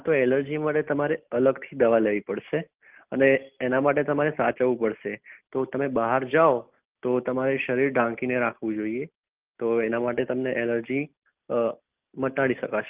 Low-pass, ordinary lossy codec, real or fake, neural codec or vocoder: 3.6 kHz; Opus, 32 kbps; real; none